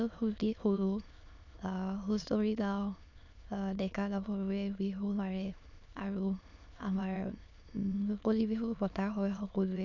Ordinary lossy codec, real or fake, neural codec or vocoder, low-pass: none; fake; autoencoder, 22.05 kHz, a latent of 192 numbers a frame, VITS, trained on many speakers; 7.2 kHz